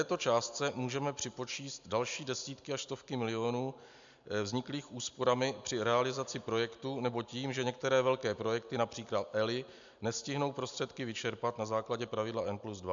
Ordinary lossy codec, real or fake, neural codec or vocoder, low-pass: MP3, 64 kbps; real; none; 7.2 kHz